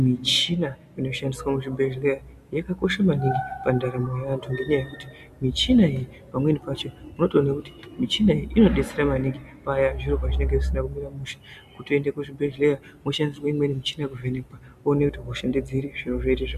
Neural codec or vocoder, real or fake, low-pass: none; real; 14.4 kHz